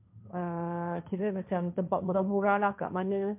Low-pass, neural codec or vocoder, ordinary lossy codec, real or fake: 3.6 kHz; codec, 16 kHz, 1.1 kbps, Voila-Tokenizer; MP3, 32 kbps; fake